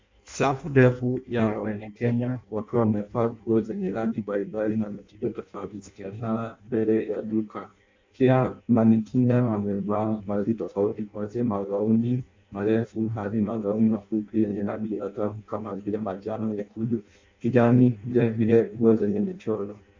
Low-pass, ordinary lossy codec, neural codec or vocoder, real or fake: 7.2 kHz; MP3, 48 kbps; codec, 16 kHz in and 24 kHz out, 0.6 kbps, FireRedTTS-2 codec; fake